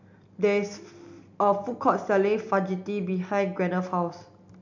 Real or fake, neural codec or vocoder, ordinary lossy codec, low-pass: real; none; none; 7.2 kHz